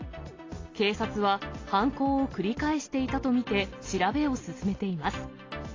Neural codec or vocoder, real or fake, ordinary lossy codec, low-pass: none; real; AAC, 32 kbps; 7.2 kHz